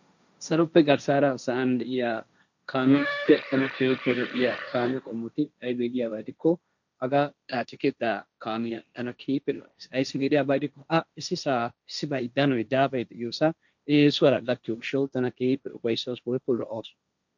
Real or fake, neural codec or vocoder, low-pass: fake; codec, 16 kHz, 1.1 kbps, Voila-Tokenizer; 7.2 kHz